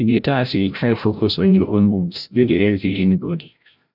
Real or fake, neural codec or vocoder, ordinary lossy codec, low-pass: fake; codec, 16 kHz, 0.5 kbps, FreqCodec, larger model; none; 5.4 kHz